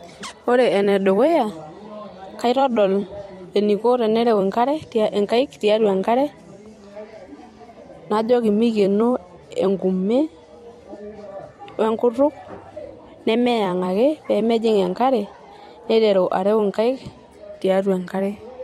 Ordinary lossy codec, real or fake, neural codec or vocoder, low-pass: MP3, 64 kbps; fake; vocoder, 44.1 kHz, 128 mel bands every 256 samples, BigVGAN v2; 19.8 kHz